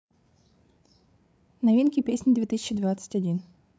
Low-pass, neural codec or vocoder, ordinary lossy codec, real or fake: none; codec, 16 kHz, 16 kbps, FreqCodec, larger model; none; fake